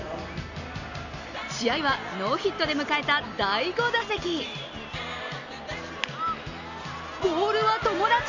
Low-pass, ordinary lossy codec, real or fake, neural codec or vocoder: 7.2 kHz; none; real; none